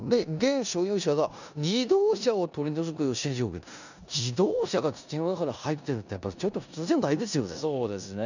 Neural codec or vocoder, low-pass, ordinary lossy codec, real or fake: codec, 16 kHz in and 24 kHz out, 0.9 kbps, LongCat-Audio-Codec, four codebook decoder; 7.2 kHz; none; fake